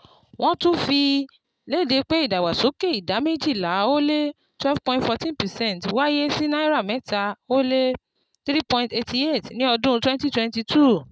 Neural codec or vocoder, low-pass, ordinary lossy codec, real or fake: none; none; none; real